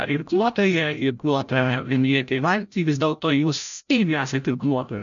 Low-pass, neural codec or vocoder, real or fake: 7.2 kHz; codec, 16 kHz, 0.5 kbps, FreqCodec, larger model; fake